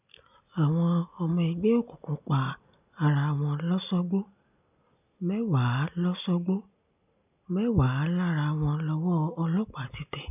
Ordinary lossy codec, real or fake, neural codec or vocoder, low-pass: none; real; none; 3.6 kHz